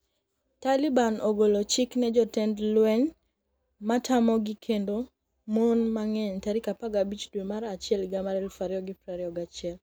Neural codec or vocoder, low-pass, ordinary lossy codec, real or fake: none; none; none; real